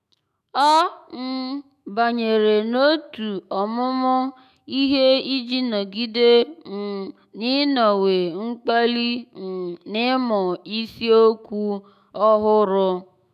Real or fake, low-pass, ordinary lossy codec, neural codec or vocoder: fake; 14.4 kHz; none; autoencoder, 48 kHz, 128 numbers a frame, DAC-VAE, trained on Japanese speech